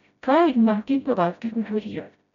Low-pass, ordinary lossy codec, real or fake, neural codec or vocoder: 7.2 kHz; none; fake; codec, 16 kHz, 0.5 kbps, FreqCodec, smaller model